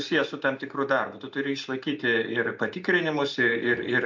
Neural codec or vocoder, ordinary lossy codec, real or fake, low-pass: none; MP3, 48 kbps; real; 7.2 kHz